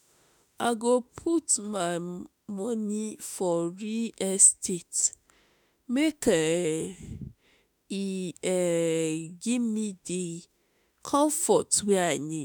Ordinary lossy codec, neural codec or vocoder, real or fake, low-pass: none; autoencoder, 48 kHz, 32 numbers a frame, DAC-VAE, trained on Japanese speech; fake; none